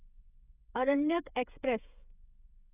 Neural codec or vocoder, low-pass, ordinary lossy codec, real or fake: codec, 16 kHz, 8 kbps, FreqCodec, smaller model; 3.6 kHz; none; fake